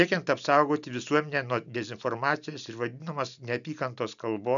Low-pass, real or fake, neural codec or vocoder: 7.2 kHz; real; none